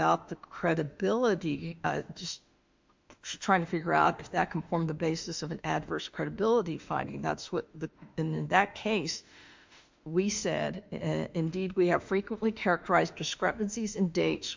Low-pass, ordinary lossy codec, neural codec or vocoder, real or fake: 7.2 kHz; MP3, 64 kbps; autoencoder, 48 kHz, 32 numbers a frame, DAC-VAE, trained on Japanese speech; fake